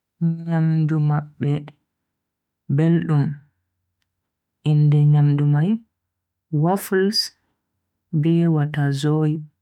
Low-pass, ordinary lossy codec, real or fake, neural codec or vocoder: 19.8 kHz; none; fake; autoencoder, 48 kHz, 32 numbers a frame, DAC-VAE, trained on Japanese speech